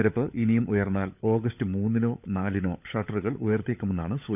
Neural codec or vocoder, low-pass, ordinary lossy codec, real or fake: codec, 16 kHz, 16 kbps, FunCodec, trained on LibriTTS, 50 frames a second; 3.6 kHz; none; fake